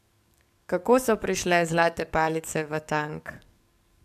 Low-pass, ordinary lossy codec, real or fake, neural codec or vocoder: 14.4 kHz; MP3, 96 kbps; fake; codec, 44.1 kHz, 7.8 kbps, DAC